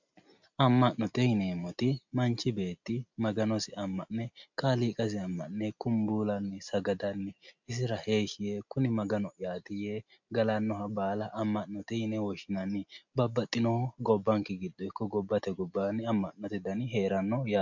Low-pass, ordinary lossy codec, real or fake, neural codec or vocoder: 7.2 kHz; MP3, 64 kbps; real; none